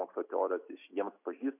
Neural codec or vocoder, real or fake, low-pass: none; real; 3.6 kHz